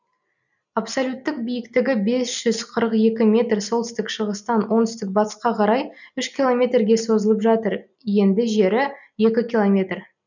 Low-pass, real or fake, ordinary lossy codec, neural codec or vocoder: 7.2 kHz; real; none; none